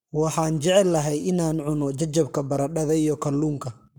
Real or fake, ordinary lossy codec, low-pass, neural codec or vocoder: fake; none; none; codec, 44.1 kHz, 7.8 kbps, Pupu-Codec